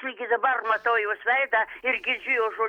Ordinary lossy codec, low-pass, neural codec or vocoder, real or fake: Opus, 64 kbps; 19.8 kHz; none; real